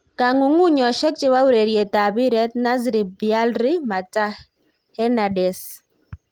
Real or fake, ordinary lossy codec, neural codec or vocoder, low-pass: real; Opus, 24 kbps; none; 19.8 kHz